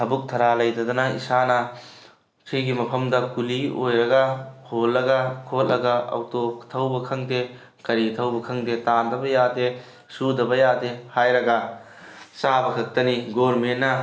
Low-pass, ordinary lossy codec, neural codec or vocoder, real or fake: none; none; none; real